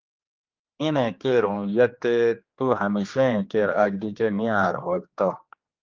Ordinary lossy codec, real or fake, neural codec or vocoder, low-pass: Opus, 24 kbps; fake; codec, 16 kHz, 2 kbps, X-Codec, HuBERT features, trained on general audio; 7.2 kHz